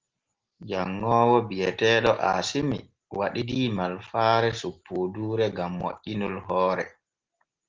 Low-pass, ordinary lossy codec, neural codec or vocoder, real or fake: 7.2 kHz; Opus, 16 kbps; none; real